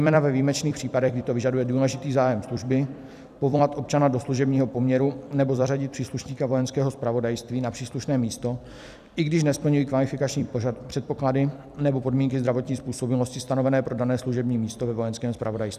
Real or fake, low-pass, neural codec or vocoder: fake; 14.4 kHz; vocoder, 44.1 kHz, 128 mel bands every 256 samples, BigVGAN v2